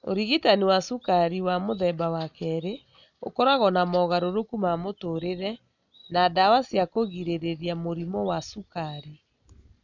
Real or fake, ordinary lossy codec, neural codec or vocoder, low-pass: real; none; none; 7.2 kHz